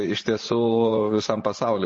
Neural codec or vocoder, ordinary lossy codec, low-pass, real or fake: vocoder, 24 kHz, 100 mel bands, Vocos; MP3, 32 kbps; 10.8 kHz; fake